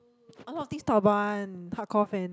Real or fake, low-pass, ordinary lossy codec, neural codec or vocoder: real; none; none; none